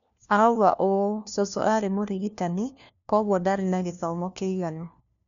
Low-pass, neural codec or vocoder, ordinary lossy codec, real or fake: 7.2 kHz; codec, 16 kHz, 1 kbps, FunCodec, trained on LibriTTS, 50 frames a second; none; fake